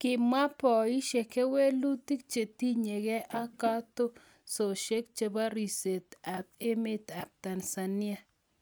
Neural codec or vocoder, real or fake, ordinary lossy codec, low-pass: none; real; none; none